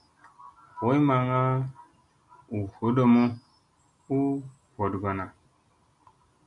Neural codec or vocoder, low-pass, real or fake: none; 10.8 kHz; real